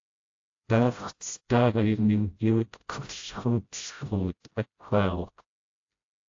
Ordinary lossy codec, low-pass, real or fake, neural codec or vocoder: AAC, 48 kbps; 7.2 kHz; fake; codec, 16 kHz, 0.5 kbps, FreqCodec, smaller model